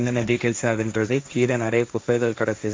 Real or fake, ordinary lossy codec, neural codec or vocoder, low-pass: fake; none; codec, 16 kHz, 1.1 kbps, Voila-Tokenizer; none